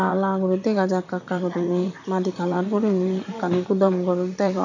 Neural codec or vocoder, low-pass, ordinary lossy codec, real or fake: vocoder, 44.1 kHz, 128 mel bands, Pupu-Vocoder; 7.2 kHz; none; fake